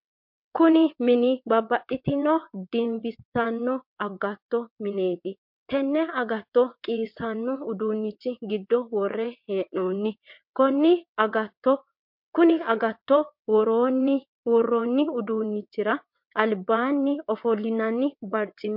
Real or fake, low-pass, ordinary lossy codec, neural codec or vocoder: fake; 5.4 kHz; MP3, 48 kbps; vocoder, 22.05 kHz, 80 mel bands, WaveNeXt